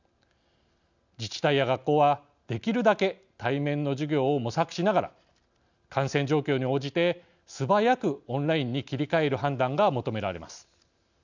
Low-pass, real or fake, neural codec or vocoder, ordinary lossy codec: 7.2 kHz; real; none; none